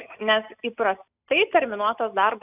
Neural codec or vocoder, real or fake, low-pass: none; real; 3.6 kHz